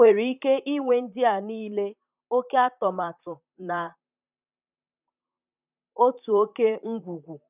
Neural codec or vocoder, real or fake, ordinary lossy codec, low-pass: vocoder, 44.1 kHz, 128 mel bands, Pupu-Vocoder; fake; none; 3.6 kHz